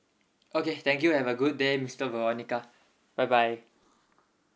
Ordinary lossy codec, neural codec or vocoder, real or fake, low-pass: none; none; real; none